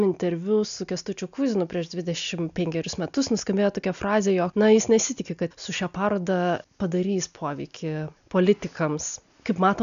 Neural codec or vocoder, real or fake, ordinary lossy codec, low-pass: none; real; MP3, 96 kbps; 7.2 kHz